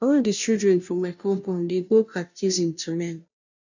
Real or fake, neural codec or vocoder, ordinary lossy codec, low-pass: fake; codec, 16 kHz, 0.5 kbps, FunCodec, trained on Chinese and English, 25 frames a second; none; 7.2 kHz